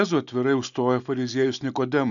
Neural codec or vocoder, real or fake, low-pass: none; real; 7.2 kHz